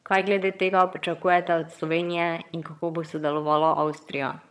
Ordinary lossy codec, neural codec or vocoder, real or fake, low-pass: none; vocoder, 22.05 kHz, 80 mel bands, HiFi-GAN; fake; none